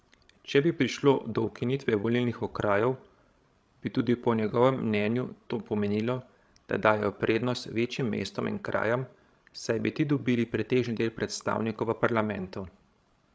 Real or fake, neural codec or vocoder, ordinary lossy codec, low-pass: fake; codec, 16 kHz, 16 kbps, FreqCodec, larger model; none; none